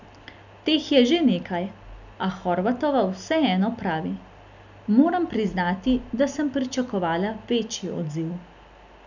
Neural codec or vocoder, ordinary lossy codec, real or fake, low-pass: none; none; real; 7.2 kHz